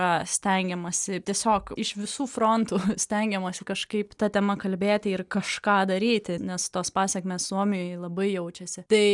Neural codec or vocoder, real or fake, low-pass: none; real; 10.8 kHz